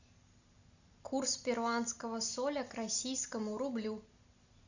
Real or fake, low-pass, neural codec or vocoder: real; 7.2 kHz; none